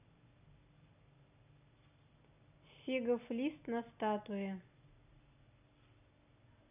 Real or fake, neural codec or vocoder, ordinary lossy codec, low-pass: real; none; none; 3.6 kHz